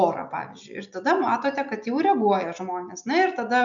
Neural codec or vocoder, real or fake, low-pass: none; real; 7.2 kHz